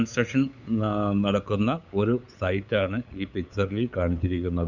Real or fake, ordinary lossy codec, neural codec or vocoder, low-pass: fake; none; codec, 16 kHz, 8 kbps, FunCodec, trained on LibriTTS, 25 frames a second; 7.2 kHz